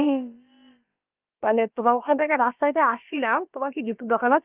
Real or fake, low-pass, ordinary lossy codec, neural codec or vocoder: fake; 3.6 kHz; Opus, 24 kbps; codec, 16 kHz, about 1 kbps, DyCAST, with the encoder's durations